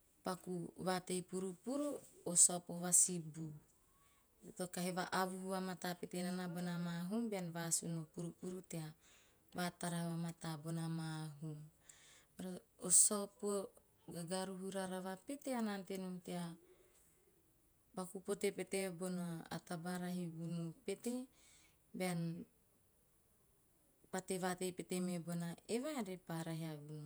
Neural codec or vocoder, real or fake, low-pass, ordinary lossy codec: vocoder, 48 kHz, 128 mel bands, Vocos; fake; none; none